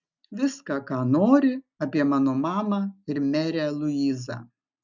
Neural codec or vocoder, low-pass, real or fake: none; 7.2 kHz; real